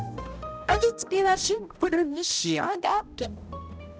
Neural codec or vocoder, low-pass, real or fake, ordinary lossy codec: codec, 16 kHz, 0.5 kbps, X-Codec, HuBERT features, trained on balanced general audio; none; fake; none